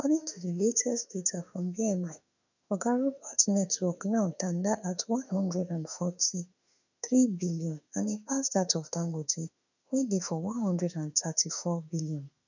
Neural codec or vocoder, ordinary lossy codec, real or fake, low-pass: autoencoder, 48 kHz, 32 numbers a frame, DAC-VAE, trained on Japanese speech; none; fake; 7.2 kHz